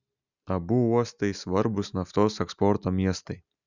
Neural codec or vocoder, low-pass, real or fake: none; 7.2 kHz; real